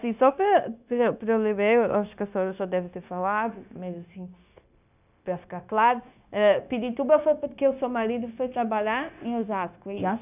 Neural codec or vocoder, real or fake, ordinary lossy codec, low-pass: codec, 16 kHz, 0.9 kbps, LongCat-Audio-Codec; fake; none; 3.6 kHz